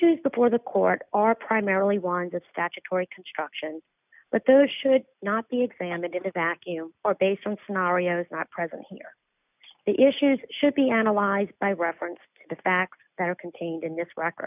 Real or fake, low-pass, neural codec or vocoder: real; 3.6 kHz; none